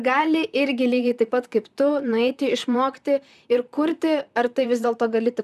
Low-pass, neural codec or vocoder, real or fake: 14.4 kHz; vocoder, 44.1 kHz, 128 mel bands, Pupu-Vocoder; fake